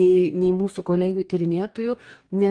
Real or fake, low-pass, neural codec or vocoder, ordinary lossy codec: fake; 9.9 kHz; codec, 44.1 kHz, 2.6 kbps, DAC; Opus, 32 kbps